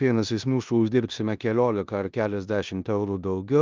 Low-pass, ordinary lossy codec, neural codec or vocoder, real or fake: 7.2 kHz; Opus, 24 kbps; codec, 16 kHz in and 24 kHz out, 0.9 kbps, LongCat-Audio-Codec, four codebook decoder; fake